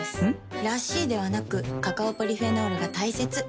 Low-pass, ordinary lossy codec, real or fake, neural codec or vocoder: none; none; real; none